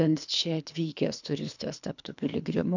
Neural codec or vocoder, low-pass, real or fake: codec, 24 kHz, 3 kbps, HILCodec; 7.2 kHz; fake